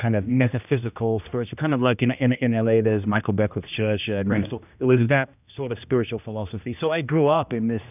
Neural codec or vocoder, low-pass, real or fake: codec, 16 kHz, 1 kbps, X-Codec, HuBERT features, trained on general audio; 3.6 kHz; fake